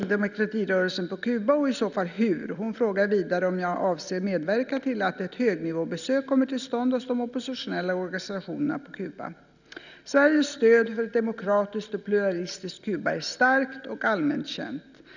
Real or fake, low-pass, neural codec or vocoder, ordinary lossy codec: real; 7.2 kHz; none; none